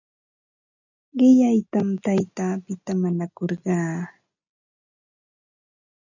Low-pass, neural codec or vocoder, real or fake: 7.2 kHz; none; real